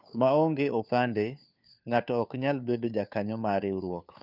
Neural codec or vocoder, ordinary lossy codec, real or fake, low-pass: codec, 16 kHz, 2 kbps, FunCodec, trained on Chinese and English, 25 frames a second; none; fake; 5.4 kHz